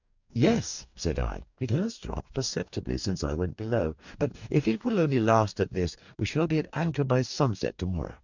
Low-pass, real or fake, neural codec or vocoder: 7.2 kHz; fake; codec, 44.1 kHz, 2.6 kbps, DAC